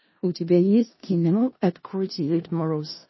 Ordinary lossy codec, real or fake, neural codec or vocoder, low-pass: MP3, 24 kbps; fake; codec, 16 kHz in and 24 kHz out, 0.4 kbps, LongCat-Audio-Codec, four codebook decoder; 7.2 kHz